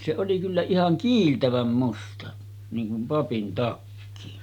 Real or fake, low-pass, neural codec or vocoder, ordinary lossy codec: real; 19.8 kHz; none; none